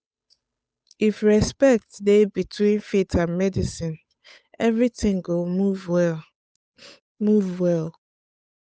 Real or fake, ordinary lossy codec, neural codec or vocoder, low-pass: fake; none; codec, 16 kHz, 8 kbps, FunCodec, trained on Chinese and English, 25 frames a second; none